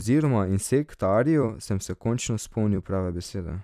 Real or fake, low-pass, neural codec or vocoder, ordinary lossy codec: fake; 14.4 kHz; vocoder, 44.1 kHz, 128 mel bands every 256 samples, BigVGAN v2; none